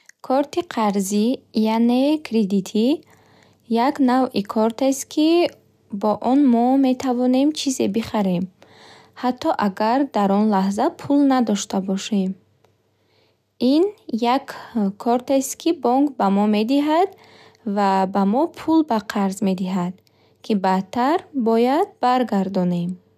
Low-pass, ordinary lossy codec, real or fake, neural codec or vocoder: 14.4 kHz; none; real; none